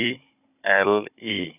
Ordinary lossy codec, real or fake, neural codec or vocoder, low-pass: none; fake; codec, 16 kHz, 16 kbps, FunCodec, trained on Chinese and English, 50 frames a second; 3.6 kHz